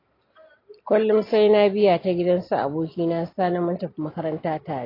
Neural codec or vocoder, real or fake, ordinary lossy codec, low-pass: none; real; AAC, 24 kbps; 5.4 kHz